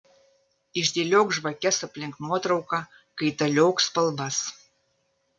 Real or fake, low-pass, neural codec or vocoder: real; 9.9 kHz; none